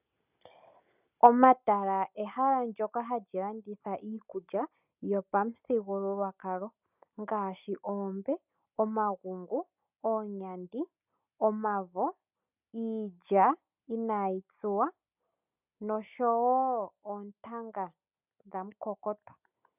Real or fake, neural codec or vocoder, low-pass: real; none; 3.6 kHz